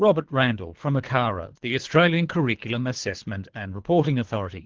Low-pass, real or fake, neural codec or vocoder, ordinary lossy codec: 7.2 kHz; fake; codec, 24 kHz, 3 kbps, HILCodec; Opus, 16 kbps